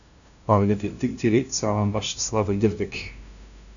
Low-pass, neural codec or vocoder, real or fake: 7.2 kHz; codec, 16 kHz, 0.5 kbps, FunCodec, trained on LibriTTS, 25 frames a second; fake